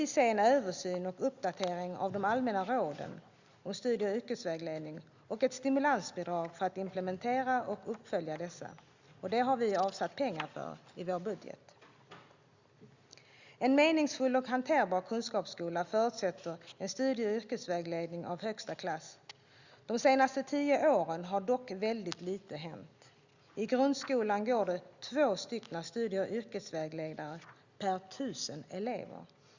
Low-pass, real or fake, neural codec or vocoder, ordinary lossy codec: 7.2 kHz; real; none; Opus, 64 kbps